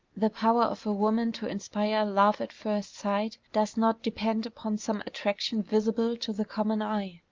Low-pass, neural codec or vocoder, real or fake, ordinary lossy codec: 7.2 kHz; none; real; Opus, 24 kbps